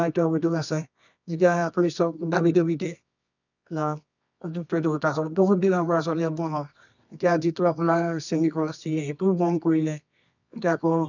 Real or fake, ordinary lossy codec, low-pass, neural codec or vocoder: fake; none; 7.2 kHz; codec, 24 kHz, 0.9 kbps, WavTokenizer, medium music audio release